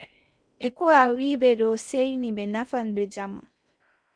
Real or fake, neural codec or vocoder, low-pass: fake; codec, 16 kHz in and 24 kHz out, 0.6 kbps, FocalCodec, streaming, 4096 codes; 9.9 kHz